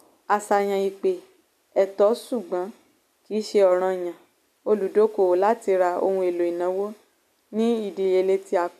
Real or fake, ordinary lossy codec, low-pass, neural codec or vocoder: real; none; 14.4 kHz; none